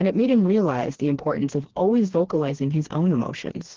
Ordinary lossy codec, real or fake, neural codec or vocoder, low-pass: Opus, 16 kbps; fake; codec, 16 kHz, 2 kbps, FreqCodec, smaller model; 7.2 kHz